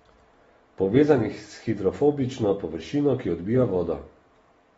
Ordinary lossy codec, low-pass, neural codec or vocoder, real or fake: AAC, 24 kbps; 19.8 kHz; none; real